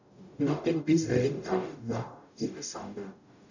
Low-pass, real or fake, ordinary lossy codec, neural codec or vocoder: 7.2 kHz; fake; none; codec, 44.1 kHz, 0.9 kbps, DAC